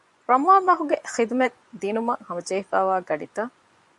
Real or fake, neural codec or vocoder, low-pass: fake; vocoder, 24 kHz, 100 mel bands, Vocos; 10.8 kHz